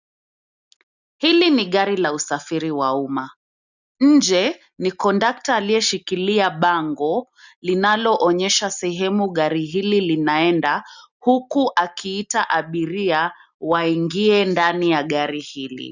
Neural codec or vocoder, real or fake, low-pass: none; real; 7.2 kHz